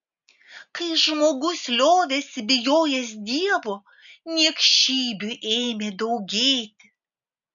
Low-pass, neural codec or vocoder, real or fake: 7.2 kHz; none; real